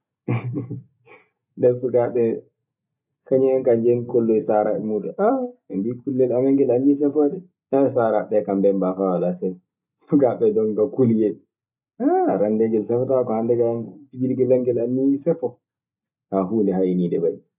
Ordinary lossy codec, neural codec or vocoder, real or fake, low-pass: none; none; real; 3.6 kHz